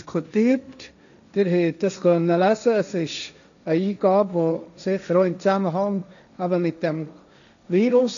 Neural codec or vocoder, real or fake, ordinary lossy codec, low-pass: codec, 16 kHz, 1.1 kbps, Voila-Tokenizer; fake; none; 7.2 kHz